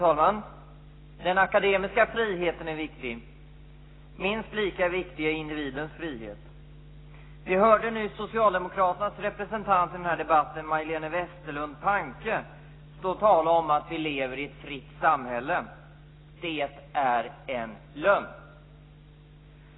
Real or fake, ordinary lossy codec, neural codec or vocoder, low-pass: real; AAC, 16 kbps; none; 7.2 kHz